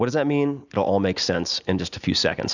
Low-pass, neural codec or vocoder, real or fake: 7.2 kHz; none; real